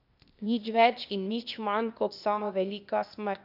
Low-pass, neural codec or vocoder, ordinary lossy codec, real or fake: 5.4 kHz; codec, 16 kHz, 0.8 kbps, ZipCodec; none; fake